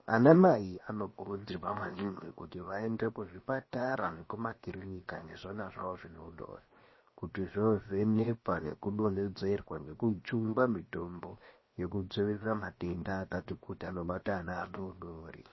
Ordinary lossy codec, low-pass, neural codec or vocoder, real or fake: MP3, 24 kbps; 7.2 kHz; codec, 16 kHz, 0.7 kbps, FocalCodec; fake